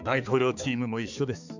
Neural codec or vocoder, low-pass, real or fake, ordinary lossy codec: codec, 16 kHz, 4 kbps, X-Codec, HuBERT features, trained on balanced general audio; 7.2 kHz; fake; none